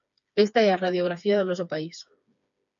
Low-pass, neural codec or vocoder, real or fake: 7.2 kHz; codec, 16 kHz, 4 kbps, FreqCodec, smaller model; fake